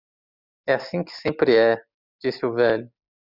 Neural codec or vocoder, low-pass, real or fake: none; 5.4 kHz; real